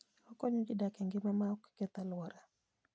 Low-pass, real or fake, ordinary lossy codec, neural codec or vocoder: none; real; none; none